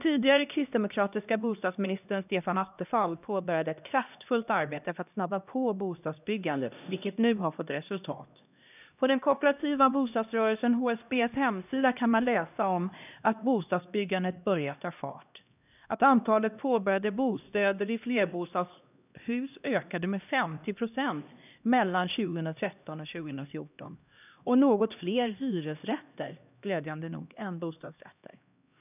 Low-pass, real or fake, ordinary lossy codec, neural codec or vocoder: 3.6 kHz; fake; none; codec, 16 kHz, 1 kbps, X-Codec, HuBERT features, trained on LibriSpeech